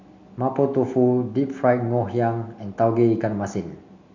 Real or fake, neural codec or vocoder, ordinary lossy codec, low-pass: real; none; MP3, 64 kbps; 7.2 kHz